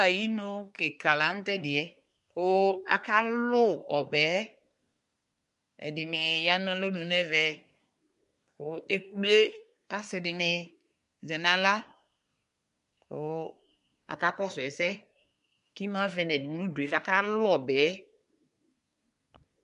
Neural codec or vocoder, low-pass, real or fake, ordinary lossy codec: codec, 24 kHz, 1 kbps, SNAC; 10.8 kHz; fake; MP3, 64 kbps